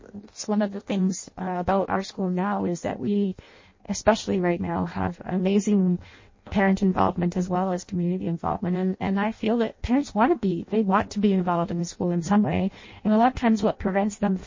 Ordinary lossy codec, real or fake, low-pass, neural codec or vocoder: MP3, 32 kbps; fake; 7.2 kHz; codec, 16 kHz in and 24 kHz out, 0.6 kbps, FireRedTTS-2 codec